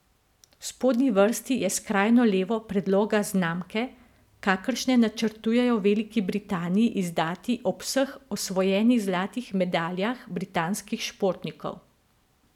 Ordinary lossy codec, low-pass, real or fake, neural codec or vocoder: none; 19.8 kHz; real; none